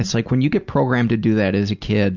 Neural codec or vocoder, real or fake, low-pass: none; real; 7.2 kHz